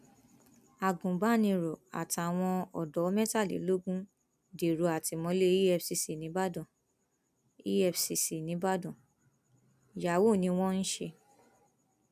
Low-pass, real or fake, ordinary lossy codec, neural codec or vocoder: 14.4 kHz; real; none; none